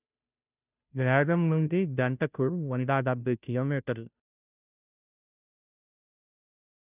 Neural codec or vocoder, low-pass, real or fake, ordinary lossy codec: codec, 16 kHz, 0.5 kbps, FunCodec, trained on Chinese and English, 25 frames a second; 3.6 kHz; fake; none